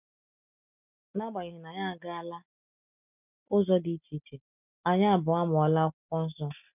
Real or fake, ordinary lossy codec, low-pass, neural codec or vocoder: real; none; 3.6 kHz; none